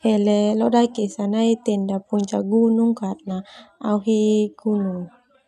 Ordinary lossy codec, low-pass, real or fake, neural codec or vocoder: none; 14.4 kHz; real; none